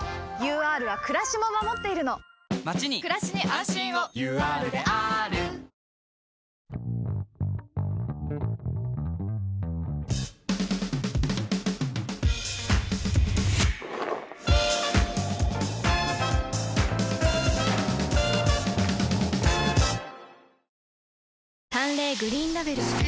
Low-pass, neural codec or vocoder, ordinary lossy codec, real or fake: none; none; none; real